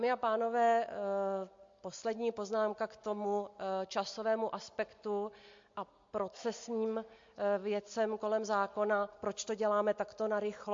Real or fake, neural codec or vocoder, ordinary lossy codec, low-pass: real; none; MP3, 48 kbps; 7.2 kHz